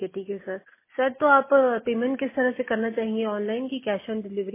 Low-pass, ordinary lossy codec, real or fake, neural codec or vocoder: 3.6 kHz; MP3, 16 kbps; real; none